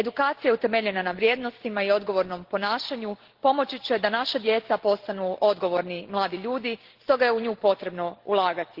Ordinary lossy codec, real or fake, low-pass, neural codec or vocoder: Opus, 16 kbps; real; 5.4 kHz; none